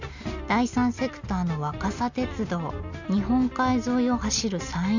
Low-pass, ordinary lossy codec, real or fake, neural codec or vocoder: 7.2 kHz; none; real; none